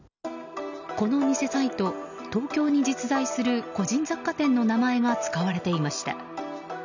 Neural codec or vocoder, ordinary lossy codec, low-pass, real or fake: none; none; 7.2 kHz; real